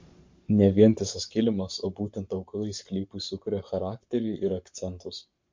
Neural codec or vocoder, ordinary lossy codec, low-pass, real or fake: codec, 44.1 kHz, 7.8 kbps, Pupu-Codec; MP3, 48 kbps; 7.2 kHz; fake